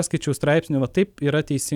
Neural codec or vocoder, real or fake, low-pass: none; real; 19.8 kHz